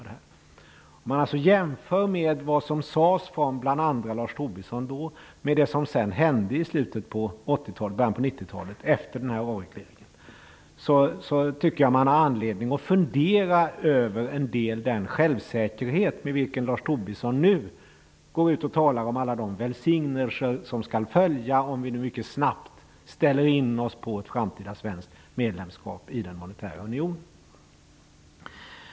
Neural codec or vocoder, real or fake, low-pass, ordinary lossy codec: none; real; none; none